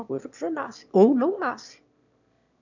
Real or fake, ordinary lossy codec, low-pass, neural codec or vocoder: fake; none; 7.2 kHz; autoencoder, 22.05 kHz, a latent of 192 numbers a frame, VITS, trained on one speaker